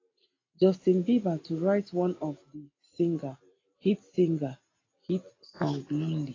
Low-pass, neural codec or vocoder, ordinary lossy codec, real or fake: 7.2 kHz; none; AAC, 32 kbps; real